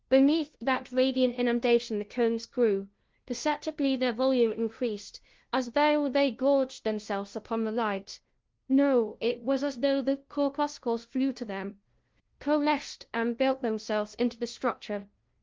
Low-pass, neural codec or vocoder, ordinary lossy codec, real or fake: 7.2 kHz; codec, 16 kHz, 0.5 kbps, FunCodec, trained on LibriTTS, 25 frames a second; Opus, 16 kbps; fake